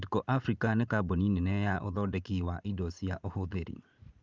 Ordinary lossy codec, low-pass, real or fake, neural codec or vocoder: Opus, 24 kbps; 7.2 kHz; fake; vocoder, 44.1 kHz, 80 mel bands, Vocos